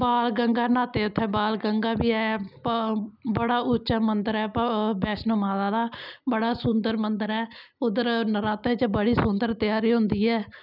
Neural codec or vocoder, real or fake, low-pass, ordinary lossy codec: none; real; 5.4 kHz; none